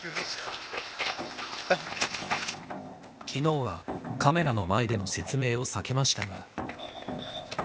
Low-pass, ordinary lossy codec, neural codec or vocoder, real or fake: none; none; codec, 16 kHz, 0.8 kbps, ZipCodec; fake